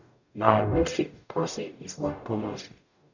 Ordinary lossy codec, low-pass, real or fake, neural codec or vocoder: none; 7.2 kHz; fake; codec, 44.1 kHz, 0.9 kbps, DAC